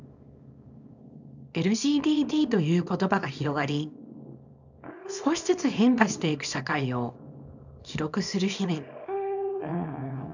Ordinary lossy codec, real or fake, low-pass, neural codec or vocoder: none; fake; 7.2 kHz; codec, 24 kHz, 0.9 kbps, WavTokenizer, small release